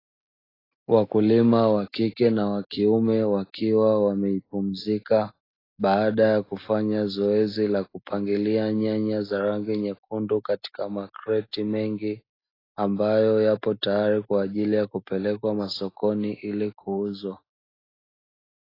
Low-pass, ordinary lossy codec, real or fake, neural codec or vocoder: 5.4 kHz; AAC, 24 kbps; real; none